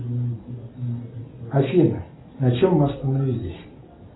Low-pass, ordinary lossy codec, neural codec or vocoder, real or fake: 7.2 kHz; AAC, 16 kbps; none; real